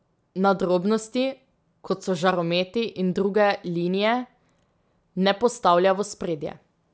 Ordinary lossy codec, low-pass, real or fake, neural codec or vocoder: none; none; real; none